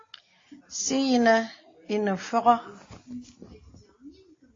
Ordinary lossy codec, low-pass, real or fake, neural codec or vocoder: AAC, 32 kbps; 7.2 kHz; real; none